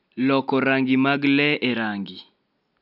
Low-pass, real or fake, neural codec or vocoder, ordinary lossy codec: 5.4 kHz; real; none; none